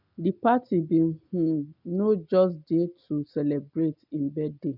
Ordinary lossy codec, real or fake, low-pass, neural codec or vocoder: none; real; 5.4 kHz; none